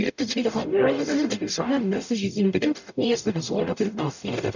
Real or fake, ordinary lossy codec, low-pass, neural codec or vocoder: fake; none; 7.2 kHz; codec, 44.1 kHz, 0.9 kbps, DAC